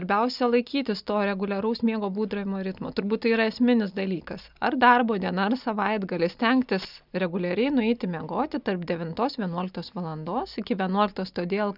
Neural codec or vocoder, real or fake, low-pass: none; real; 5.4 kHz